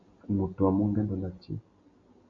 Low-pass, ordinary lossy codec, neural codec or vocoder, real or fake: 7.2 kHz; MP3, 48 kbps; none; real